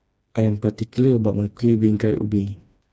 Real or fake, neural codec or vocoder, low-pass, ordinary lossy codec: fake; codec, 16 kHz, 2 kbps, FreqCodec, smaller model; none; none